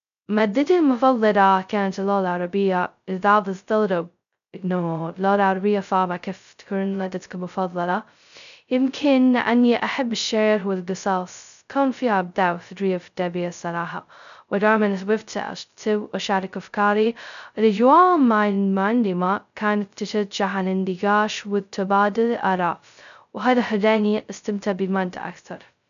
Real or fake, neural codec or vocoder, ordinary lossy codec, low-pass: fake; codec, 16 kHz, 0.2 kbps, FocalCodec; none; 7.2 kHz